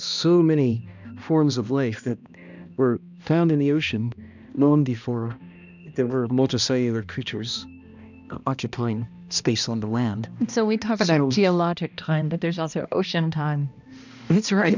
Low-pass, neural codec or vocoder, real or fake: 7.2 kHz; codec, 16 kHz, 1 kbps, X-Codec, HuBERT features, trained on balanced general audio; fake